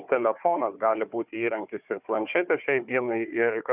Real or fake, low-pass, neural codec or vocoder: fake; 3.6 kHz; codec, 16 kHz, 4 kbps, FunCodec, trained on Chinese and English, 50 frames a second